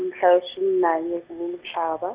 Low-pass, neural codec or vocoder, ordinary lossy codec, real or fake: 3.6 kHz; none; Opus, 64 kbps; real